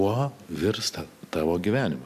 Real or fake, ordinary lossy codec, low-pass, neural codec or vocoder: real; AAC, 96 kbps; 14.4 kHz; none